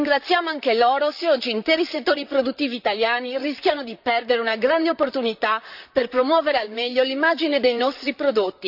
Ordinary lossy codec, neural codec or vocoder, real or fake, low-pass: none; vocoder, 44.1 kHz, 128 mel bands, Pupu-Vocoder; fake; 5.4 kHz